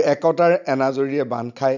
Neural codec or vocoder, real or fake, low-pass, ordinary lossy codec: none; real; 7.2 kHz; none